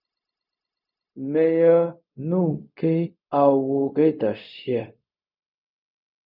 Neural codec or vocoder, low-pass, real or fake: codec, 16 kHz, 0.4 kbps, LongCat-Audio-Codec; 5.4 kHz; fake